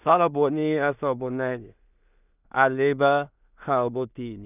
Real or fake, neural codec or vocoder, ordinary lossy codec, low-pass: fake; codec, 16 kHz in and 24 kHz out, 0.4 kbps, LongCat-Audio-Codec, two codebook decoder; none; 3.6 kHz